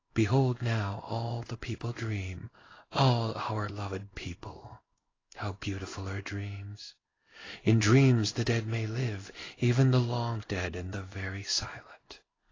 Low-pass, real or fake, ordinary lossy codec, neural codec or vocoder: 7.2 kHz; fake; AAC, 32 kbps; codec, 16 kHz in and 24 kHz out, 1 kbps, XY-Tokenizer